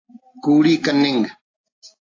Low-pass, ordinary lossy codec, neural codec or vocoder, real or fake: 7.2 kHz; AAC, 32 kbps; none; real